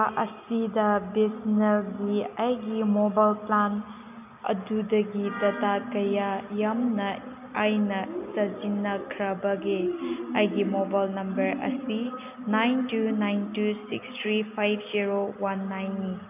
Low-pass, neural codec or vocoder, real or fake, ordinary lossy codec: 3.6 kHz; none; real; none